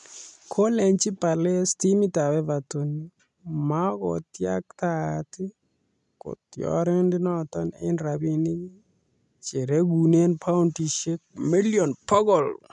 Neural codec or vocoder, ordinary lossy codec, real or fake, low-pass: none; none; real; 10.8 kHz